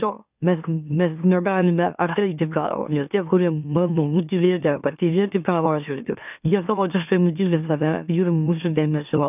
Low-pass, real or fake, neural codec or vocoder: 3.6 kHz; fake; autoencoder, 44.1 kHz, a latent of 192 numbers a frame, MeloTTS